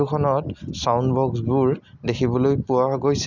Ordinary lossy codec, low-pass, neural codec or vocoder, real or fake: none; 7.2 kHz; none; real